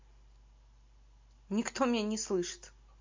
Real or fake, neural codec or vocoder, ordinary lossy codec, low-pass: real; none; MP3, 48 kbps; 7.2 kHz